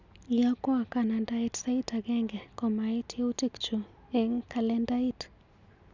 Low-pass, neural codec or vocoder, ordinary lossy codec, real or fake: 7.2 kHz; none; none; real